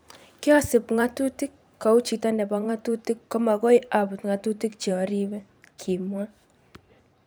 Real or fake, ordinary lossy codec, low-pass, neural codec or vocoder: fake; none; none; vocoder, 44.1 kHz, 128 mel bands every 512 samples, BigVGAN v2